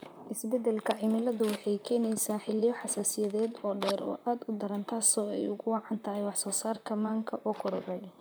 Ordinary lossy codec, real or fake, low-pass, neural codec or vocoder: none; fake; none; vocoder, 44.1 kHz, 128 mel bands, Pupu-Vocoder